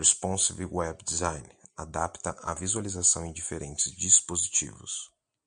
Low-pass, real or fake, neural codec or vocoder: 9.9 kHz; real; none